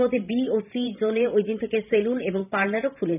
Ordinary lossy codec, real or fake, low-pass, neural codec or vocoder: none; fake; 3.6 kHz; vocoder, 44.1 kHz, 128 mel bands every 512 samples, BigVGAN v2